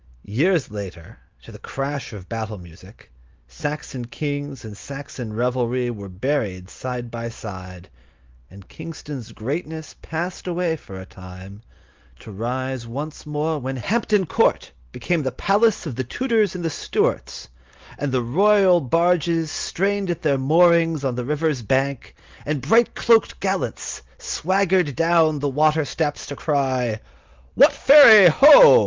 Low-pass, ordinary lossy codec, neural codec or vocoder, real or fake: 7.2 kHz; Opus, 32 kbps; none; real